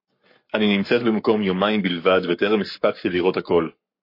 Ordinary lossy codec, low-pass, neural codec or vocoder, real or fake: MP3, 32 kbps; 5.4 kHz; codec, 44.1 kHz, 7.8 kbps, Pupu-Codec; fake